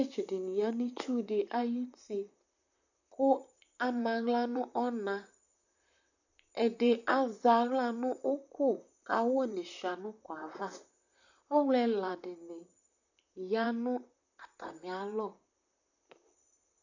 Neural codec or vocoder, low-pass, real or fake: vocoder, 44.1 kHz, 128 mel bands, Pupu-Vocoder; 7.2 kHz; fake